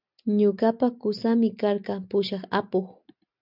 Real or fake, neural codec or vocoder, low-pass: real; none; 5.4 kHz